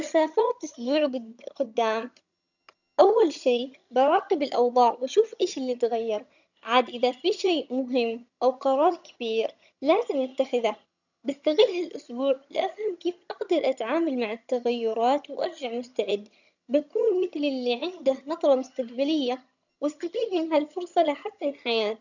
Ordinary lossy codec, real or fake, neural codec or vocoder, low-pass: none; fake; vocoder, 22.05 kHz, 80 mel bands, HiFi-GAN; 7.2 kHz